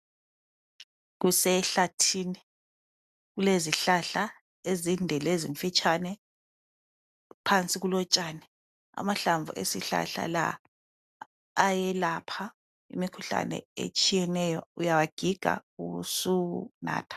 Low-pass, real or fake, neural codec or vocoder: 14.4 kHz; real; none